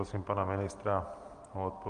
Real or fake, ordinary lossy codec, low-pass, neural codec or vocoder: real; Opus, 24 kbps; 9.9 kHz; none